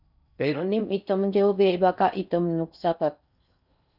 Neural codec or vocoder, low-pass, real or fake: codec, 16 kHz in and 24 kHz out, 0.6 kbps, FocalCodec, streaming, 4096 codes; 5.4 kHz; fake